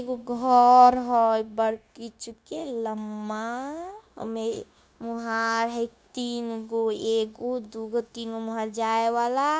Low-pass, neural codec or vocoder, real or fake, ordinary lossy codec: none; codec, 16 kHz, 0.9 kbps, LongCat-Audio-Codec; fake; none